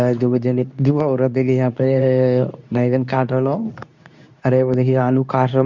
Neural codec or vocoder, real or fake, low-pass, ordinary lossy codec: codec, 24 kHz, 0.9 kbps, WavTokenizer, medium speech release version 2; fake; 7.2 kHz; none